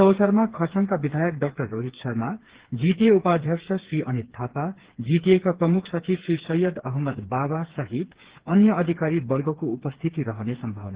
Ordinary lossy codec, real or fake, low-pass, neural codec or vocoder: Opus, 16 kbps; fake; 3.6 kHz; codec, 16 kHz, 4 kbps, FreqCodec, smaller model